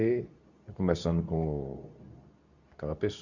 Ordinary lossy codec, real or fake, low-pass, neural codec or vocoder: Opus, 64 kbps; fake; 7.2 kHz; codec, 24 kHz, 0.9 kbps, WavTokenizer, medium speech release version 2